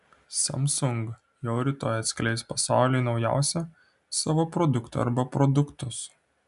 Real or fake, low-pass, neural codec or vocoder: real; 10.8 kHz; none